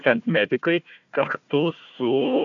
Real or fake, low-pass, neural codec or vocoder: fake; 7.2 kHz; codec, 16 kHz, 1 kbps, FunCodec, trained on Chinese and English, 50 frames a second